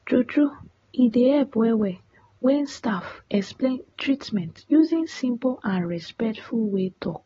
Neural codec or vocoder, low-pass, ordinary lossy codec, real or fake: none; 7.2 kHz; AAC, 24 kbps; real